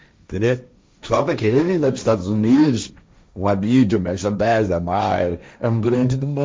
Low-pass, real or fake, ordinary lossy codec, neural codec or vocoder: none; fake; none; codec, 16 kHz, 1.1 kbps, Voila-Tokenizer